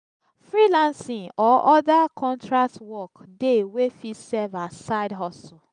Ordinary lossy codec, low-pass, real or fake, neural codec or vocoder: none; 9.9 kHz; real; none